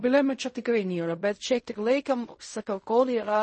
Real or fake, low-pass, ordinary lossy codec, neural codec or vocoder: fake; 10.8 kHz; MP3, 32 kbps; codec, 16 kHz in and 24 kHz out, 0.4 kbps, LongCat-Audio-Codec, fine tuned four codebook decoder